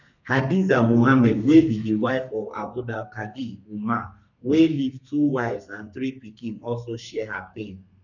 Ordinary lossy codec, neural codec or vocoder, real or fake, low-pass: none; codec, 32 kHz, 1.9 kbps, SNAC; fake; 7.2 kHz